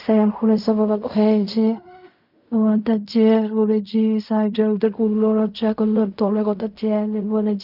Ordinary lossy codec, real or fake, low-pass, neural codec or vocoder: none; fake; 5.4 kHz; codec, 16 kHz in and 24 kHz out, 0.4 kbps, LongCat-Audio-Codec, fine tuned four codebook decoder